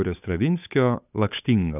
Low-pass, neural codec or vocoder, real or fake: 3.6 kHz; codec, 16 kHz, 8 kbps, FunCodec, trained on Chinese and English, 25 frames a second; fake